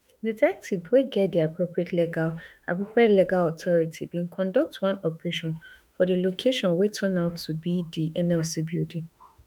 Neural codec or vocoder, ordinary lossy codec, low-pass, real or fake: autoencoder, 48 kHz, 32 numbers a frame, DAC-VAE, trained on Japanese speech; none; none; fake